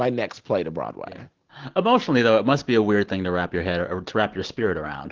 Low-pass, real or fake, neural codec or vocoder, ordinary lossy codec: 7.2 kHz; real; none; Opus, 16 kbps